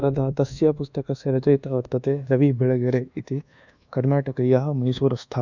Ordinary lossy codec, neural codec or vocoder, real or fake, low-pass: none; codec, 24 kHz, 1.2 kbps, DualCodec; fake; 7.2 kHz